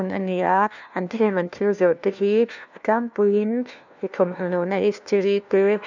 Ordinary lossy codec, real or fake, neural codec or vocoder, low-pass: none; fake; codec, 16 kHz, 1 kbps, FunCodec, trained on LibriTTS, 50 frames a second; 7.2 kHz